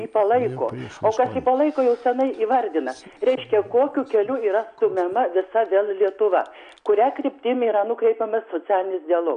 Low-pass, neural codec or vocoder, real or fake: 9.9 kHz; none; real